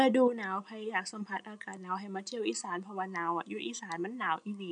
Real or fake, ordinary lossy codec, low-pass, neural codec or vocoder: real; none; 9.9 kHz; none